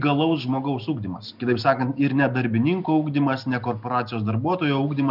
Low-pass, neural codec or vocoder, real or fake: 5.4 kHz; none; real